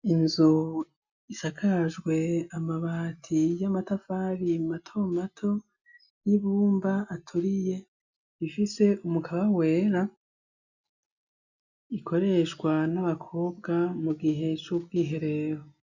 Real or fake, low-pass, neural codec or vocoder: real; 7.2 kHz; none